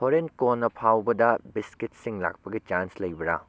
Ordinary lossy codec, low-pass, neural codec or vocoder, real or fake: none; none; none; real